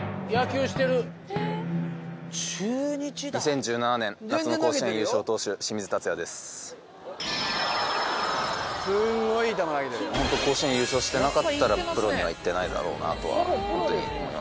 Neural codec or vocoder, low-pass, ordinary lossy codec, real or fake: none; none; none; real